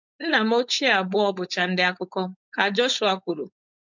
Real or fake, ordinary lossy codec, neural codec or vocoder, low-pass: fake; MP3, 64 kbps; codec, 16 kHz, 4.8 kbps, FACodec; 7.2 kHz